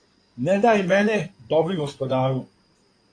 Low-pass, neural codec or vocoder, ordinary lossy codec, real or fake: 9.9 kHz; codec, 16 kHz in and 24 kHz out, 2.2 kbps, FireRedTTS-2 codec; AAC, 48 kbps; fake